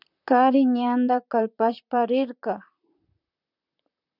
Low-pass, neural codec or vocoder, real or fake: 5.4 kHz; vocoder, 44.1 kHz, 128 mel bands, Pupu-Vocoder; fake